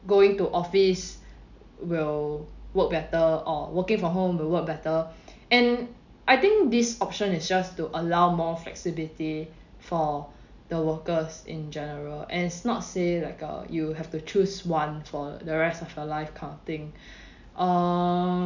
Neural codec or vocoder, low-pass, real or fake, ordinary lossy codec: none; 7.2 kHz; real; none